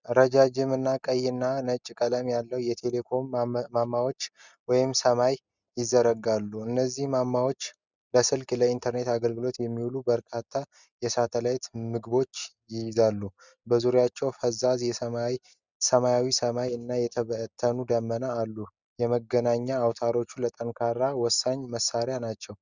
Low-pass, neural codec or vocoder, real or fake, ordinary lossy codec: 7.2 kHz; none; real; Opus, 64 kbps